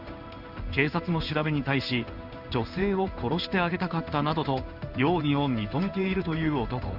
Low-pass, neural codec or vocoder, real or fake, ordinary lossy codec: 5.4 kHz; codec, 16 kHz in and 24 kHz out, 1 kbps, XY-Tokenizer; fake; Opus, 64 kbps